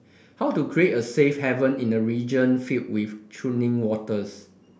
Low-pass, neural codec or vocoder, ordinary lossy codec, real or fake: none; none; none; real